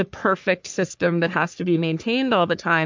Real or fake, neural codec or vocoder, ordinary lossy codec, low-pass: fake; codec, 44.1 kHz, 3.4 kbps, Pupu-Codec; MP3, 64 kbps; 7.2 kHz